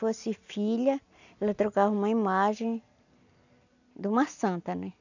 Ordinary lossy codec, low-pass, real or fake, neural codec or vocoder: none; 7.2 kHz; real; none